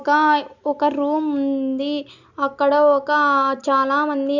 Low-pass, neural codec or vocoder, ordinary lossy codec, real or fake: 7.2 kHz; none; none; real